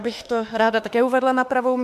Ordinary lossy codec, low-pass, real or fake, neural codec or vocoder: MP3, 96 kbps; 14.4 kHz; fake; autoencoder, 48 kHz, 32 numbers a frame, DAC-VAE, trained on Japanese speech